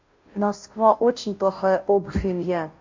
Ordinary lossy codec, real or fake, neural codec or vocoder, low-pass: MP3, 48 kbps; fake; codec, 16 kHz, 0.5 kbps, FunCodec, trained on Chinese and English, 25 frames a second; 7.2 kHz